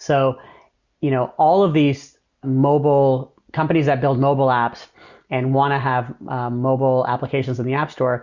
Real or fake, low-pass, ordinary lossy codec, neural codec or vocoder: real; 7.2 kHz; Opus, 64 kbps; none